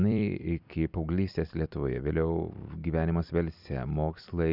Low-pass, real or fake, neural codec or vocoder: 5.4 kHz; fake; vocoder, 44.1 kHz, 128 mel bands every 256 samples, BigVGAN v2